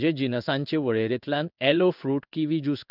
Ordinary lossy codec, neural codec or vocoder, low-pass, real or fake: none; codec, 16 kHz in and 24 kHz out, 1 kbps, XY-Tokenizer; 5.4 kHz; fake